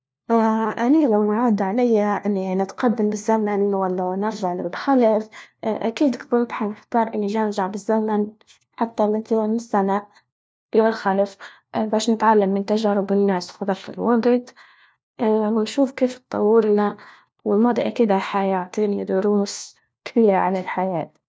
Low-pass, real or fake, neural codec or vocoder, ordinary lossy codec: none; fake; codec, 16 kHz, 1 kbps, FunCodec, trained on LibriTTS, 50 frames a second; none